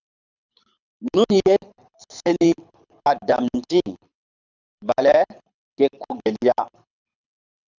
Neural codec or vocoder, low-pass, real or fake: codec, 24 kHz, 6 kbps, HILCodec; 7.2 kHz; fake